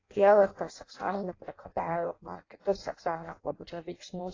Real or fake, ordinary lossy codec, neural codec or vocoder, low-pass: fake; AAC, 32 kbps; codec, 16 kHz in and 24 kHz out, 0.6 kbps, FireRedTTS-2 codec; 7.2 kHz